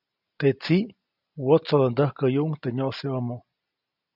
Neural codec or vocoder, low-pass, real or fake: none; 5.4 kHz; real